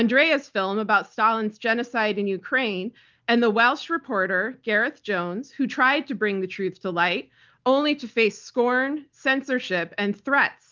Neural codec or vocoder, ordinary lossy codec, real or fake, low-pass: none; Opus, 24 kbps; real; 7.2 kHz